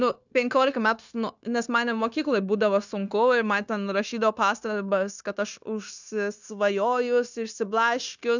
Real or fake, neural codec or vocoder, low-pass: fake; codec, 16 kHz, 0.9 kbps, LongCat-Audio-Codec; 7.2 kHz